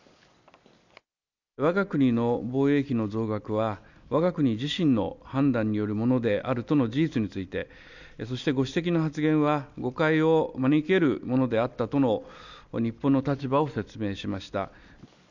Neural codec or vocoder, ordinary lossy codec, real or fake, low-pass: none; none; real; 7.2 kHz